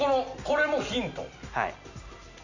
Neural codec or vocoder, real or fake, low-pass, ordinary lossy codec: none; real; 7.2 kHz; none